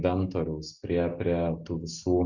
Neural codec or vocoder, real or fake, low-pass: none; real; 7.2 kHz